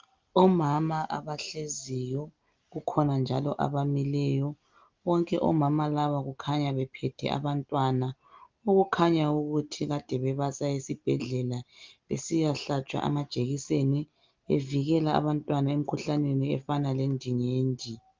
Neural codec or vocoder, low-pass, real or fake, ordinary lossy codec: none; 7.2 kHz; real; Opus, 32 kbps